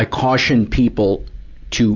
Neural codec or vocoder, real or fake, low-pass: none; real; 7.2 kHz